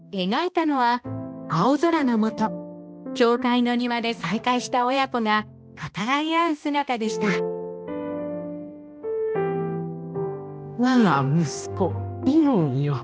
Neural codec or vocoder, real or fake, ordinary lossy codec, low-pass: codec, 16 kHz, 1 kbps, X-Codec, HuBERT features, trained on balanced general audio; fake; none; none